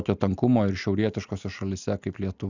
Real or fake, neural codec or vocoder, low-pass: real; none; 7.2 kHz